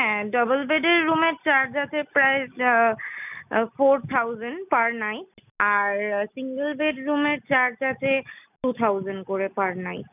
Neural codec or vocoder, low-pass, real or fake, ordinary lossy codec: none; 3.6 kHz; real; none